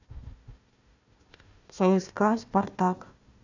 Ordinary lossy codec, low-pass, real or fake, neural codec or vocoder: none; 7.2 kHz; fake; codec, 16 kHz, 1 kbps, FunCodec, trained on Chinese and English, 50 frames a second